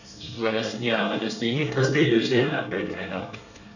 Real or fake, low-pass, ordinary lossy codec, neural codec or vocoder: fake; 7.2 kHz; none; codec, 24 kHz, 1 kbps, SNAC